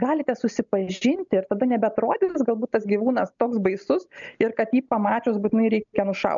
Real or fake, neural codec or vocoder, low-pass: real; none; 7.2 kHz